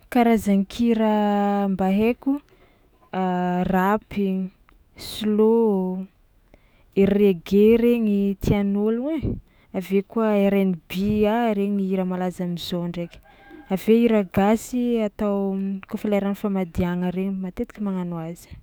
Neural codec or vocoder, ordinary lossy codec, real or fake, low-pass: autoencoder, 48 kHz, 128 numbers a frame, DAC-VAE, trained on Japanese speech; none; fake; none